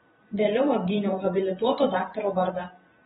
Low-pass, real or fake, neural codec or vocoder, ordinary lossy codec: 19.8 kHz; fake; vocoder, 44.1 kHz, 128 mel bands every 512 samples, BigVGAN v2; AAC, 16 kbps